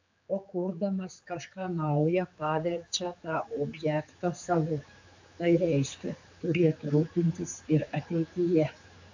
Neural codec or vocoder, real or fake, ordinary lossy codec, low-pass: codec, 16 kHz, 4 kbps, X-Codec, HuBERT features, trained on general audio; fake; AAC, 48 kbps; 7.2 kHz